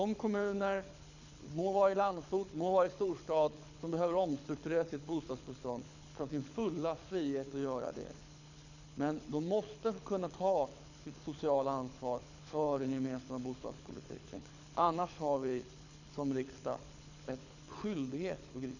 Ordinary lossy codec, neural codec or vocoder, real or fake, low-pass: none; codec, 24 kHz, 6 kbps, HILCodec; fake; 7.2 kHz